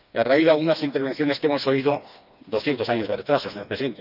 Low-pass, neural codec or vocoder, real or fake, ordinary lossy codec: 5.4 kHz; codec, 16 kHz, 2 kbps, FreqCodec, smaller model; fake; none